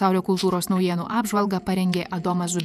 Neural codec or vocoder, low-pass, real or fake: vocoder, 48 kHz, 128 mel bands, Vocos; 14.4 kHz; fake